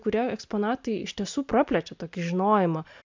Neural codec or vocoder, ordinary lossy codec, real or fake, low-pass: none; MP3, 64 kbps; real; 7.2 kHz